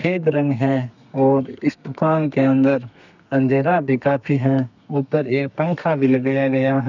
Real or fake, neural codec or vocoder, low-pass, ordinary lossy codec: fake; codec, 32 kHz, 1.9 kbps, SNAC; 7.2 kHz; none